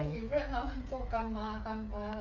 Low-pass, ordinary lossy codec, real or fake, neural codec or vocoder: 7.2 kHz; none; fake; codec, 16 kHz, 8 kbps, FreqCodec, smaller model